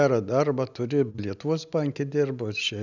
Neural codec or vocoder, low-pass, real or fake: none; 7.2 kHz; real